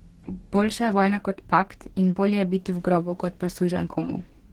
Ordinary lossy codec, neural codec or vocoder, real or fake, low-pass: Opus, 16 kbps; codec, 44.1 kHz, 2.6 kbps, DAC; fake; 19.8 kHz